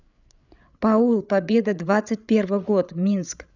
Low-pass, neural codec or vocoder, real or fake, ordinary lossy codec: 7.2 kHz; codec, 16 kHz, 8 kbps, FreqCodec, larger model; fake; none